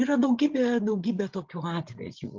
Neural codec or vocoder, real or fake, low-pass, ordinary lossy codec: codec, 16 kHz, 8 kbps, FreqCodec, larger model; fake; 7.2 kHz; Opus, 24 kbps